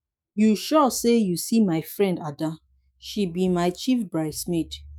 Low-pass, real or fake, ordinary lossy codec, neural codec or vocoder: none; fake; none; autoencoder, 48 kHz, 128 numbers a frame, DAC-VAE, trained on Japanese speech